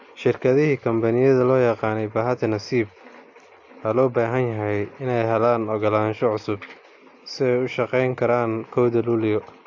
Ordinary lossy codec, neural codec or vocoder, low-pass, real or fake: AAC, 48 kbps; none; 7.2 kHz; real